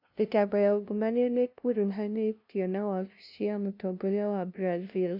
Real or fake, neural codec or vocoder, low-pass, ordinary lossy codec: fake; codec, 16 kHz, 0.5 kbps, FunCodec, trained on LibriTTS, 25 frames a second; 5.4 kHz; none